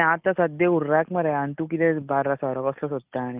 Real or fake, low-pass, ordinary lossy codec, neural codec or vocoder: real; 3.6 kHz; Opus, 24 kbps; none